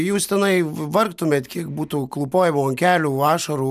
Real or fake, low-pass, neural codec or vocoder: real; 14.4 kHz; none